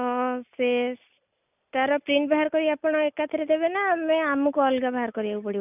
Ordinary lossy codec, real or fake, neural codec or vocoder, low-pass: none; real; none; 3.6 kHz